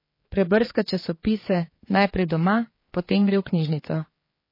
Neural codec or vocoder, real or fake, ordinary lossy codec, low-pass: codec, 16 kHz, 4 kbps, X-Codec, HuBERT features, trained on general audio; fake; MP3, 24 kbps; 5.4 kHz